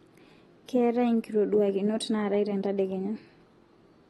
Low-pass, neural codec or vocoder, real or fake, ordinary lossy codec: 19.8 kHz; none; real; AAC, 32 kbps